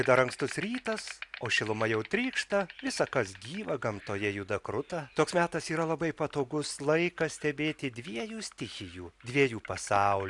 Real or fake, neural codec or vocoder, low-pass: real; none; 10.8 kHz